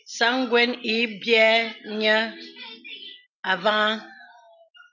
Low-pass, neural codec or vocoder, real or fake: 7.2 kHz; none; real